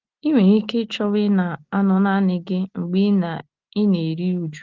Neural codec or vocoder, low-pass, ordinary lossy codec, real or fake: none; 7.2 kHz; Opus, 16 kbps; real